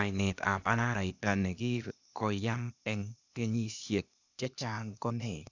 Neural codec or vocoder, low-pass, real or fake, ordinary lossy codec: codec, 16 kHz, 0.8 kbps, ZipCodec; 7.2 kHz; fake; none